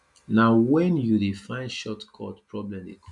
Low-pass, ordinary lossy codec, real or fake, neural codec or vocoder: 10.8 kHz; none; real; none